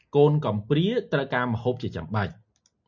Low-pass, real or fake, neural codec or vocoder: 7.2 kHz; real; none